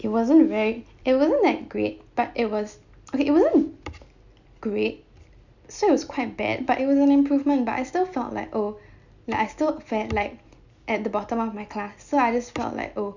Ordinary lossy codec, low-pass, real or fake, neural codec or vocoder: none; 7.2 kHz; real; none